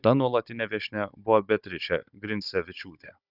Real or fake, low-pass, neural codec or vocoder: fake; 5.4 kHz; codec, 16 kHz, 16 kbps, FunCodec, trained on Chinese and English, 50 frames a second